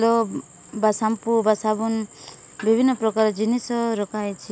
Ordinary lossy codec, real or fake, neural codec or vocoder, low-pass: none; real; none; none